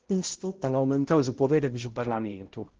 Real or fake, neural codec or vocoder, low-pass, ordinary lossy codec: fake; codec, 16 kHz, 0.5 kbps, X-Codec, HuBERT features, trained on balanced general audio; 7.2 kHz; Opus, 16 kbps